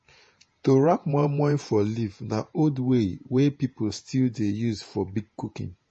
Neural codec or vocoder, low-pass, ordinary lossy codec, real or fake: vocoder, 48 kHz, 128 mel bands, Vocos; 10.8 kHz; MP3, 32 kbps; fake